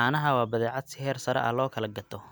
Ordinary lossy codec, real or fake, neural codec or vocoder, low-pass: none; real; none; none